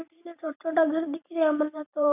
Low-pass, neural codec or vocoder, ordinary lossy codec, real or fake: 3.6 kHz; vocoder, 44.1 kHz, 128 mel bands every 512 samples, BigVGAN v2; none; fake